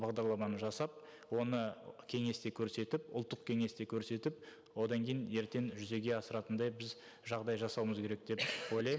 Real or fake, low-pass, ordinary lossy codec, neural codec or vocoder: real; none; none; none